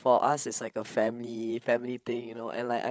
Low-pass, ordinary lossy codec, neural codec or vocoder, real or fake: none; none; codec, 16 kHz, 8 kbps, FreqCodec, larger model; fake